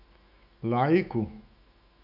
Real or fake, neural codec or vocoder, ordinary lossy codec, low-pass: fake; autoencoder, 48 kHz, 128 numbers a frame, DAC-VAE, trained on Japanese speech; none; 5.4 kHz